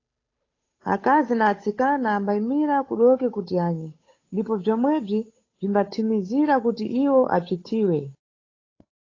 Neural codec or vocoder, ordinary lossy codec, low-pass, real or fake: codec, 16 kHz, 8 kbps, FunCodec, trained on Chinese and English, 25 frames a second; AAC, 32 kbps; 7.2 kHz; fake